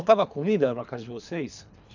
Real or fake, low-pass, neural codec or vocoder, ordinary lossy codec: fake; 7.2 kHz; codec, 24 kHz, 3 kbps, HILCodec; none